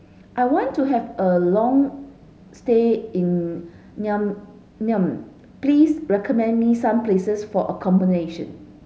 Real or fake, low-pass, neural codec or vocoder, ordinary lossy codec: real; none; none; none